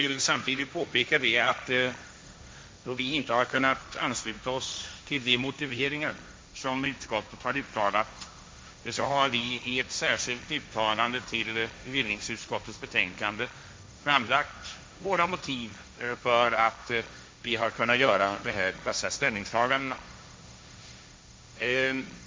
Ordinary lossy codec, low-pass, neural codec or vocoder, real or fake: none; none; codec, 16 kHz, 1.1 kbps, Voila-Tokenizer; fake